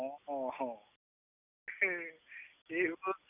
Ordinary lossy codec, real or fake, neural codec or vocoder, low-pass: none; real; none; 3.6 kHz